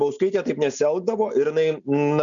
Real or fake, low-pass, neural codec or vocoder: real; 7.2 kHz; none